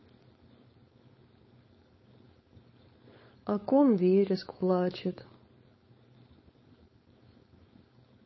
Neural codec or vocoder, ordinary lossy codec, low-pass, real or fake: codec, 16 kHz, 4.8 kbps, FACodec; MP3, 24 kbps; 7.2 kHz; fake